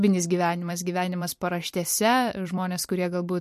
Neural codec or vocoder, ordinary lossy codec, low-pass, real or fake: vocoder, 44.1 kHz, 128 mel bands every 256 samples, BigVGAN v2; MP3, 64 kbps; 14.4 kHz; fake